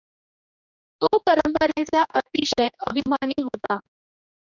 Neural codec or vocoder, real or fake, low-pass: codec, 16 kHz, 2 kbps, X-Codec, HuBERT features, trained on general audio; fake; 7.2 kHz